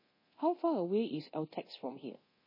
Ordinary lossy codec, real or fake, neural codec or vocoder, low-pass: MP3, 24 kbps; fake; codec, 24 kHz, 0.9 kbps, DualCodec; 5.4 kHz